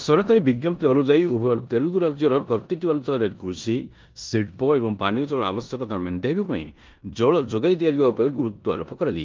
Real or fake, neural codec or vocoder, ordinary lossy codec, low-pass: fake; codec, 16 kHz in and 24 kHz out, 0.9 kbps, LongCat-Audio-Codec, four codebook decoder; Opus, 24 kbps; 7.2 kHz